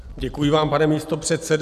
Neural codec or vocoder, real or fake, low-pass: none; real; 14.4 kHz